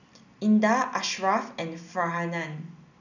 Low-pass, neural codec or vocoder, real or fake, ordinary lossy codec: 7.2 kHz; none; real; none